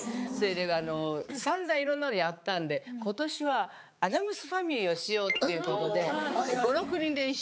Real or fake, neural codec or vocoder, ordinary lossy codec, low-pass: fake; codec, 16 kHz, 4 kbps, X-Codec, HuBERT features, trained on balanced general audio; none; none